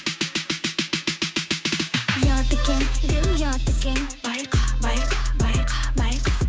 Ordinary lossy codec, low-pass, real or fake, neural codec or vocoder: none; none; fake; codec, 16 kHz, 6 kbps, DAC